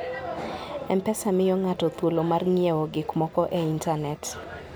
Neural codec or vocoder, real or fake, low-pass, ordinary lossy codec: none; real; none; none